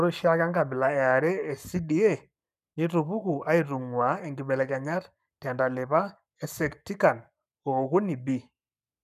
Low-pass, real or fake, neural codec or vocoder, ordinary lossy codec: 14.4 kHz; fake; codec, 44.1 kHz, 7.8 kbps, Pupu-Codec; none